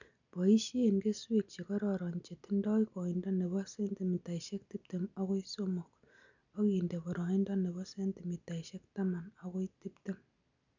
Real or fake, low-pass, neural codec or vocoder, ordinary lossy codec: real; 7.2 kHz; none; none